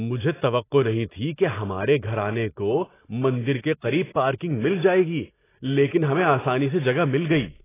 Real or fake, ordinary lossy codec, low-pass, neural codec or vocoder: real; AAC, 16 kbps; 3.6 kHz; none